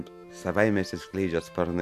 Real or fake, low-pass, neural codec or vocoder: real; 14.4 kHz; none